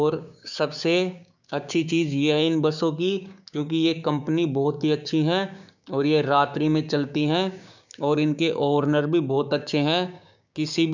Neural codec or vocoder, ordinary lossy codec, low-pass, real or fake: codec, 16 kHz, 6 kbps, DAC; none; 7.2 kHz; fake